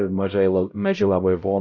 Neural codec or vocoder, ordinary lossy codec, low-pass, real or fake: codec, 16 kHz, 0.5 kbps, X-Codec, HuBERT features, trained on LibriSpeech; AAC, 48 kbps; 7.2 kHz; fake